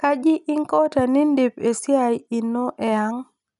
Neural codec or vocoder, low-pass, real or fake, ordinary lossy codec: none; 10.8 kHz; real; none